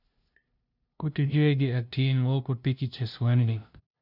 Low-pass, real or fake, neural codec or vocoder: 5.4 kHz; fake; codec, 16 kHz, 0.5 kbps, FunCodec, trained on LibriTTS, 25 frames a second